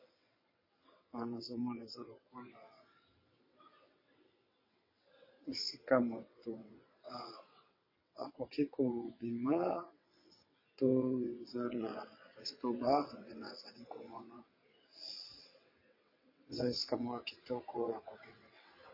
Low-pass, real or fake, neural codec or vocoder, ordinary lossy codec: 5.4 kHz; fake; vocoder, 22.05 kHz, 80 mel bands, WaveNeXt; MP3, 24 kbps